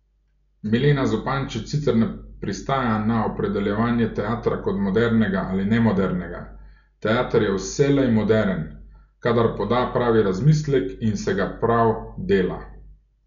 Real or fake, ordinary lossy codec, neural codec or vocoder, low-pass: real; none; none; 7.2 kHz